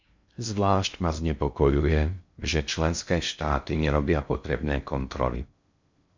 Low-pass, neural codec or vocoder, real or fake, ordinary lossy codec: 7.2 kHz; codec, 16 kHz in and 24 kHz out, 0.6 kbps, FocalCodec, streaming, 2048 codes; fake; MP3, 64 kbps